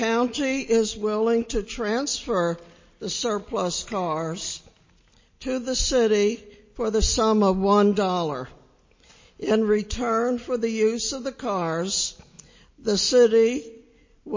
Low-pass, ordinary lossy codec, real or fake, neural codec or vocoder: 7.2 kHz; MP3, 32 kbps; real; none